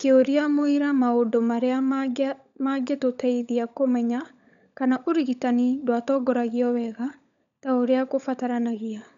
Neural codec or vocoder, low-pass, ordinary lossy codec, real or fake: codec, 16 kHz, 16 kbps, FunCodec, trained on LibriTTS, 50 frames a second; 7.2 kHz; none; fake